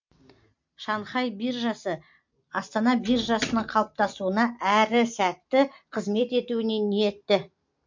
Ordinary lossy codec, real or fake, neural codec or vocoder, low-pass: MP3, 48 kbps; real; none; 7.2 kHz